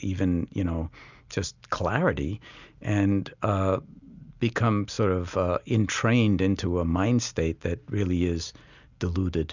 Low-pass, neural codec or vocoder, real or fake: 7.2 kHz; none; real